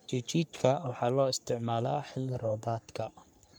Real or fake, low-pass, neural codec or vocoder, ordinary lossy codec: fake; none; codec, 44.1 kHz, 3.4 kbps, Pupu-Codec; none